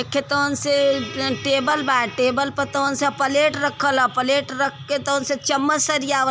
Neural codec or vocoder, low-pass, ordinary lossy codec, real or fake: none; none; none; real